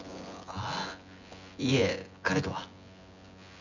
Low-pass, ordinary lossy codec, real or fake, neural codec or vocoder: 7.2 kHz; none; fake; vocoder, 24 kHz, 100 mel bands, Vocos